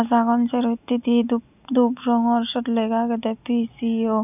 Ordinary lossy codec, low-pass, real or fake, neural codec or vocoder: none; 3.6 kHz; real; none